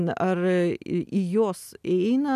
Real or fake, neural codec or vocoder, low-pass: real; none; 14.4 kHz